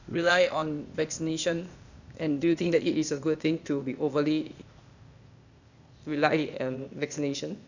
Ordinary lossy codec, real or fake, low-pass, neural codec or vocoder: none; fake; 7.2 kHz; codec, 16 kHz, 0.8 kbps, ZipCodec